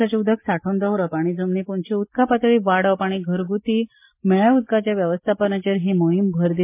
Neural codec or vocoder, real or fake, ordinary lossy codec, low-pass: none; real; MP3, 24 kbps; 3.6 kHz